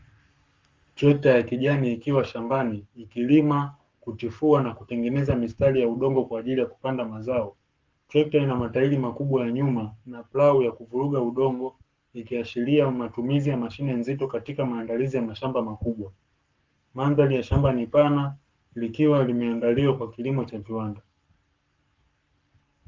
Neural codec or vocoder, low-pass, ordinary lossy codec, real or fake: codec, 44.1 kHz, 7.8 kbps, Pupu-Codec; 7.2 kHz; Opus, 32 kbps; fake